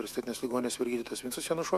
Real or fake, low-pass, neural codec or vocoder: fake; 14.4 kHz; autoencoder, 48 kHz, 128 numbers a frame, DAC-VAE, trained on Japanese speech